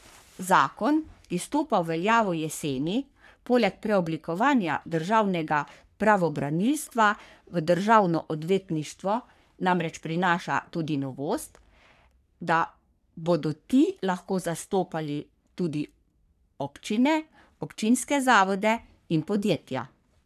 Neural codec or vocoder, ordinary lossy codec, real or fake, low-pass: codec, 44.1 kHz, 3.4 kbps, Pupu-Codec; none; fake; 14.4 kHz